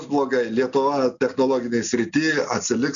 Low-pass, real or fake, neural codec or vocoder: 7.2 kHz; real; none